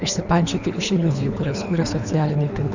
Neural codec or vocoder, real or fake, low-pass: codec, 24 kHz, 3 kbps, HILCodec; fake; 7.2 kHz